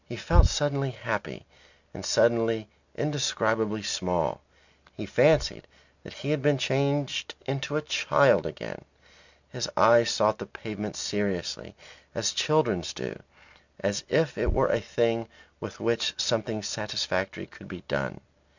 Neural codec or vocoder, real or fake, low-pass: none; real; 7.2 kHz